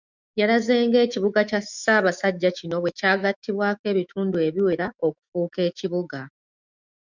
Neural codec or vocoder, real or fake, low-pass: autoencoder, 48 kHz, 128 numbers a frame, DAC-VAE, trained on Japanese speech; fake; 7.2 kHz